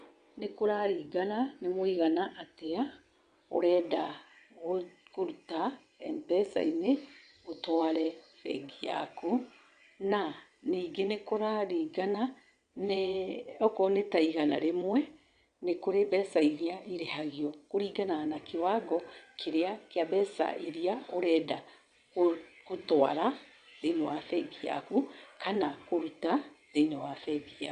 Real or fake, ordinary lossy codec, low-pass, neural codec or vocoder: fake; Opus, 64 kbps; 9.9 kHz; vocoder, 22.05 kHz, 80 mel bands, WaveNeXt